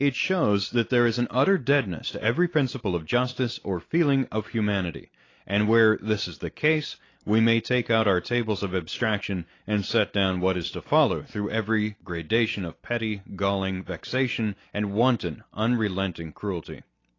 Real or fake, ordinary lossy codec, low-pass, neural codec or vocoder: real; AAC, 32 kbps; 7.2 kHz; none